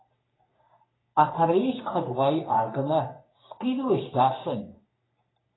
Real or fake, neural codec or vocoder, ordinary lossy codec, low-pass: fake; codec, 44.1 kHz, 3.4 kbps, Pupu-Codec; AAC, 16 kbps; 7.2 kHz